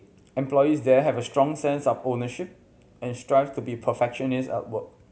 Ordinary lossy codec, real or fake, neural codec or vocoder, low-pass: none; real; none; none